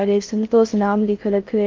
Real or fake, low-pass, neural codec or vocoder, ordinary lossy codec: fake; 7.2 kHz; codec, 16 kHz in and 24 kHz out, 0.6 kbps, FocalCodec, streaming, 4096 codes; Opus, 24 kbps